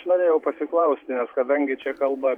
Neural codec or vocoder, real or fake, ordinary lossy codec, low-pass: vocoder, 44.1 kHz, 128 mel bands every 512 samples, BigVGAN v2; fake; MP3, 96 kbps; 19.8 kHz